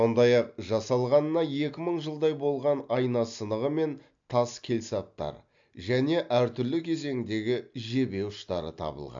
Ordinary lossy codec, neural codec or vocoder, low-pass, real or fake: MP3, 64 kbps; none; 7.2 kHz; real